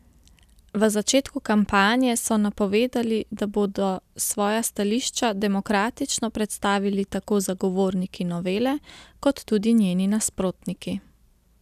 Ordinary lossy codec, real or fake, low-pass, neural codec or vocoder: none; real; 14.4 kHz; none